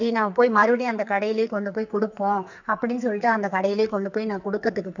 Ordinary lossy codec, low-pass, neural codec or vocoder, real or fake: none; 7.2 kHz; codec, 44.1 kHz, 2.6 kbps, SNAC; fake